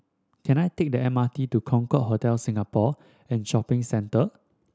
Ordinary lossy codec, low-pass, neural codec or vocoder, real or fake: none; none; none; real